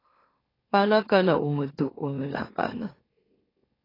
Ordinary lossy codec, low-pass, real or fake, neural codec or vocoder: AAC, 24 kbps; 5.4 kHz; fake; autoencoder, 44.1 kHz, a latent of 192 numbers a frame, MeloTTS